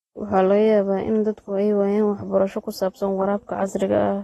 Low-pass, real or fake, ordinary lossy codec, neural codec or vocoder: 19.8 kHz; real; AAC, 32 kbps; none